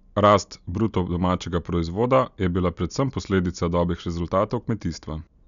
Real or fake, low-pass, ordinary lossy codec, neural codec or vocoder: real; 7.2 kHz; none; none